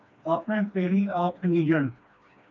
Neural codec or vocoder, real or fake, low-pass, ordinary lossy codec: codec, 16 kHz, 2 kbps, FreqCodec, smaller model; fake; 7.2 kHz; AAC, 64 kbps